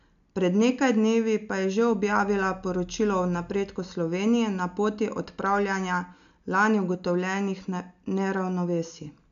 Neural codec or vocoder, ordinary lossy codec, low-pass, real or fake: none; none; 7.2 kHz; real